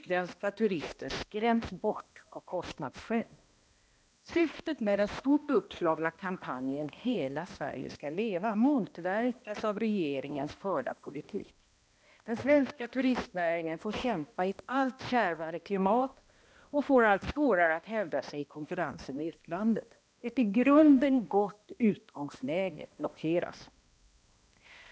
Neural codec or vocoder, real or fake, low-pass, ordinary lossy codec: codec, 16 kHz, 1 kbps, X-Codec, HuBERT features, trained on balanced general audio; fake; none; none